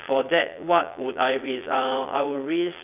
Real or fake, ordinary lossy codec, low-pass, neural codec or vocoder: fake; none; 3.6 kHz; vocoder, 22.05 kHz, 80 mel bands, Vocos